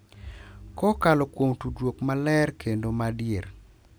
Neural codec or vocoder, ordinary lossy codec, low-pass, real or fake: none; none; none; real